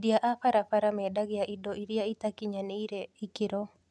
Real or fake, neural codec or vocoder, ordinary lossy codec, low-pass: real; none; none; none